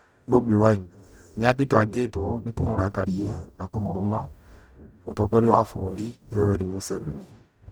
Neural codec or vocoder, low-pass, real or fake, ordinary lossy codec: codec, 44.1 kHz, 0.9 kbps, DAC; none; fake; none